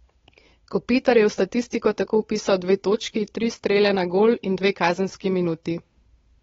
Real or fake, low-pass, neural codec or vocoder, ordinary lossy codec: fake; 7.2 kHz; codec, 16 kHz, 8 kbps, FunCodec, trained on Chinese and English, 25 frames a second; AAC, 24 kbps